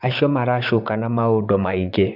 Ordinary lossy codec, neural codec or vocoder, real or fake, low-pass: none; vocoder, 44.1 kHz, 128 mel bands, Pupu-Vocoder; fake; 5.4 kHz